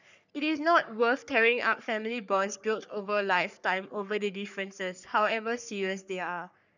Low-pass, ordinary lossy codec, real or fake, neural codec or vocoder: 7.2 kHz; none; fake; codec, 44.1 kHz, 3.4 kbps, Pupu-Codec